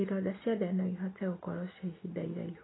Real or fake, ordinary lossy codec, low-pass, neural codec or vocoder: real; AAC, 16 kbps; 7.2 kHz; none